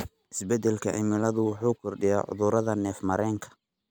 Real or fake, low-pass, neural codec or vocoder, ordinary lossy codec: real; none; none; none